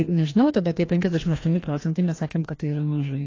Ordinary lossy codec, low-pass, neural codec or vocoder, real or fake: AAC, 32 kbps; 7.2 kHz; codec, 16 kHz, 1 kbps, FreqCodec, larger model; fake